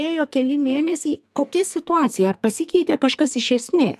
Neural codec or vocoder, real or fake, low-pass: codec, 44.1 kHz, 2.6 kbps, SNAC; fake; 14.4 kHz